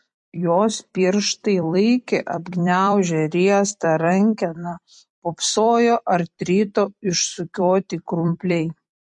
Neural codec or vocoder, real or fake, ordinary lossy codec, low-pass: vocoder, 44.1 kHz, 128 mel bands every 256 samples, BigVGAN v2; fake; MP3, 48 kbps; 10.8 kHz